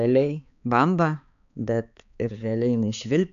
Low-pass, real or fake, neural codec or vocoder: 7.2 kHz; fake; codec, 16 kHz, 4 kbps, X-Codec, HuBERT features, trained on balanced general audio